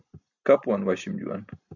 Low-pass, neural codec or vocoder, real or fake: 7.2 kHz; none; real